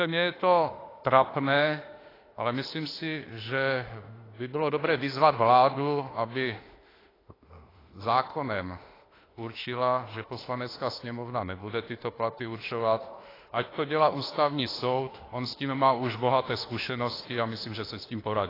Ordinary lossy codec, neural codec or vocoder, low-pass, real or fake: AAC, 24 kbps; autoencoder, 48 kHz, 32 numbers a frame, DAC-VAE, trained on Japanese speech; 5.4 kHz; fake